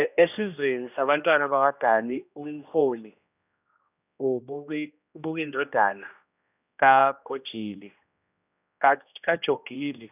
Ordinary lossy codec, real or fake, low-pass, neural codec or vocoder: none; fake; 3.6 kHz; codec, 16 kHz, 1 kbps, X-Codec, HuBERT features, trained on general audio